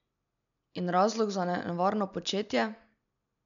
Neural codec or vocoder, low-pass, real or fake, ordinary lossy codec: none; 7.2 kHz; real; none